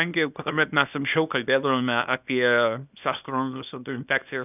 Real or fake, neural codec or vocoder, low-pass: fake; codec, 24 kHz, 0.9 kbps, WavTokenizer, small release; 3.6 kHz